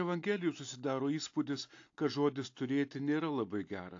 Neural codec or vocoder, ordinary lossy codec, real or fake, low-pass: none; AAC, 48 kbps; real; 7.2 kHz